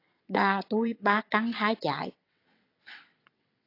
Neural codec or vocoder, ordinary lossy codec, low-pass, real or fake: vocoder, 22.05 kHz, 80 mel bands, WaveNeXt; AAC, 32 kbps; 5.4 kHz; fake